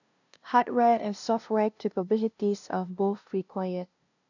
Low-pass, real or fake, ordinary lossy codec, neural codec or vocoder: 7.2 kHz; fake; none; codec, 16 kHz, 0.5 kbps, FunCodec, trained on LibriTTS, 25 frames a second